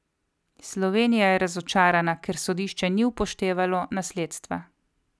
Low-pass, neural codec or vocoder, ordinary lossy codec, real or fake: none; none; none; real